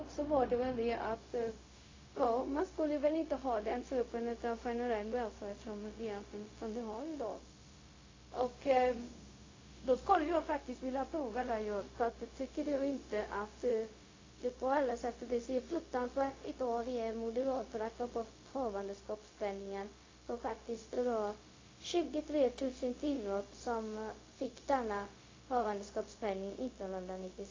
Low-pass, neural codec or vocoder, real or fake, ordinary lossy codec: 7.2 kHz; codec, 16 kHz, 0.4 kbps, LongCat-Audio-Codec; fake; AAC, 32 kbps